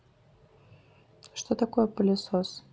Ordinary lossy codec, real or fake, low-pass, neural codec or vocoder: none; real; none; none